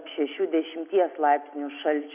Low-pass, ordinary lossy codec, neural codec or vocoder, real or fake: 3.6 kHz; AAC, 32 kbps; none; real